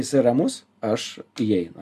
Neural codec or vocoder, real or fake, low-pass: none; real; 14.4 kHz